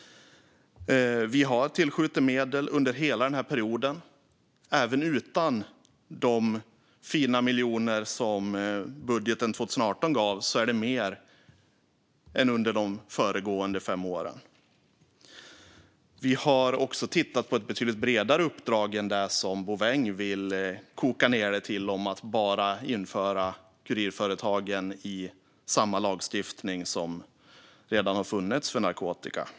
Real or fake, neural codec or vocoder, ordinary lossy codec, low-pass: real; none; none; none